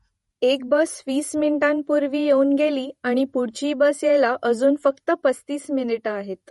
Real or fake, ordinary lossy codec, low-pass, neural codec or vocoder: fake; MP3, 48 kbps; 19.8 kHz; vocoder, 48 kHz, 128 mel bands, Vocos